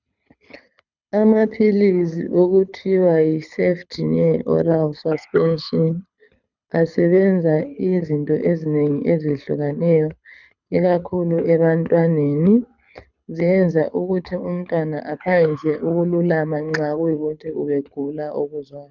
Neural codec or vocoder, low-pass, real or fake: codec, 24 kHz, 6 kbps, HILCodec; 7.2 kHz; fake